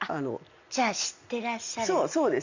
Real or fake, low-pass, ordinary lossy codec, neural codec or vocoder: real; 7.2 kHz; Opus, 64 kbps; none